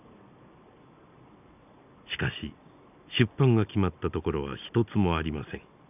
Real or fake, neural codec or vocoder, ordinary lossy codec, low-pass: real; none; none; 3.6 kHz